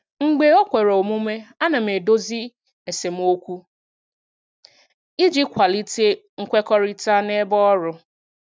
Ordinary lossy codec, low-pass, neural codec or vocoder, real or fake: none; none; none; real